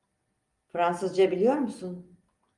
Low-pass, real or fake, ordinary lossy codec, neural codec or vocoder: 10.8 kHz; real; Opus, 32 kbps; none